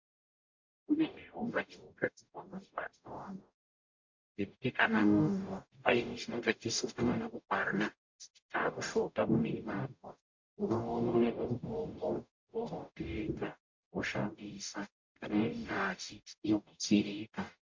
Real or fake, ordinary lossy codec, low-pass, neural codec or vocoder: fake; MP3, 48 kbps; 7.2 kHz; codec, 44.1 kHz, 0.9 kbps, DAC